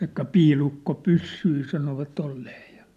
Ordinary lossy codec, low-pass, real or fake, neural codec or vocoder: none; 14.4 kHz; real; none